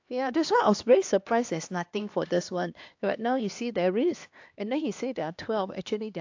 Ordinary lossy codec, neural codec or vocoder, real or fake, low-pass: AAC, 48 kbps; codec, 16 kHz, 2 kbps, X-Codec, HuBERT features, trained on LibriSpeech; fake; 7.2 kHz